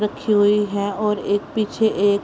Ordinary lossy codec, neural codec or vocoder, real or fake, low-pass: none; none; real; none